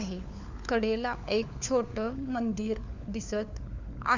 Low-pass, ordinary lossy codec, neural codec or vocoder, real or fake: 7.2 kHz; none; codec, 16 kHz, 4 kbps, FunCodec, trained on LibriTTS, 50 frames a second; fake